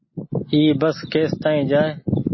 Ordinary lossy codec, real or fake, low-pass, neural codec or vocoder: MP3, 24 kbps; real; 7.2 kHz; none